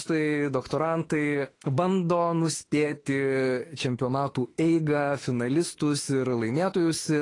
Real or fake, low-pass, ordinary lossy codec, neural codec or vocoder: fake; 10.8 kHz; AAC, 32 kbps; autoencoder, 48 kHz, 32 numbers a frame, DAC-VAE, trained on Japanese speech